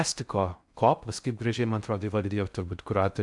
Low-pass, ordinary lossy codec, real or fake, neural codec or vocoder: 10.8 kHz; MP3, 96 kbps; fake; codec, 16 kHz in and 24 kHz out, 0.6 kbps, FocalCodec, streaming, 4096 codes